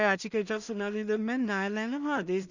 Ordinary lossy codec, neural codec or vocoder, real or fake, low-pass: none; codec, 16 kHz in and 24 kHz out, 0.4 kbps, LongCat-Audio-Codec, two codebook decoder; fake; 7.2 kHz